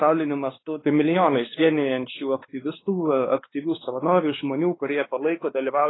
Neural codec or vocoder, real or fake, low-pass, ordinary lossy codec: codec, 16 kHz, 4 kbps, X-Codec, WavLM features, trained on Multilingual LibriSpeech; fake; 7.2 kHz; AAC, 16 kbps